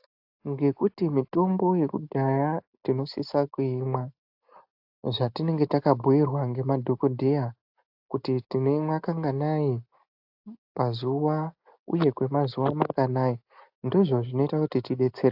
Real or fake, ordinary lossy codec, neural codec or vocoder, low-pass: real; AAC, 48 kbps; none; 5.4 kHz